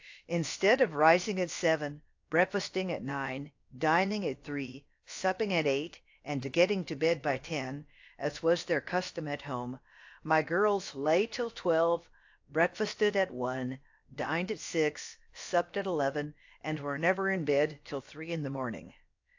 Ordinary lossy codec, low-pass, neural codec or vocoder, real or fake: AAC, 48 kbps; 7.2 kHz; codec, 16 kHz, about 1 kbps, DyCAST, with the encoder's durations; fake